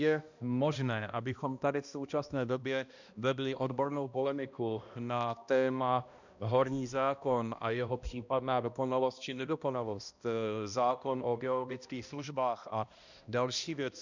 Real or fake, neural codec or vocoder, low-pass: fake; codec, 16 kHz, 1 kbps, X-Codec, HuBERT features, trained on balanced general audio; 7.2 kHz